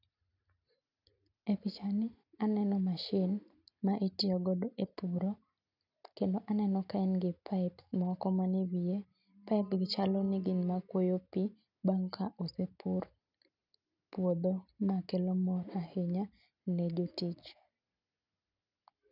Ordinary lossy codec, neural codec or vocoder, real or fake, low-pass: none; none; real; 5.4 kHz